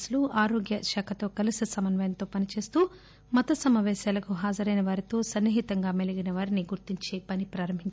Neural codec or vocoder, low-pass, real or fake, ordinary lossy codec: none; none; real; none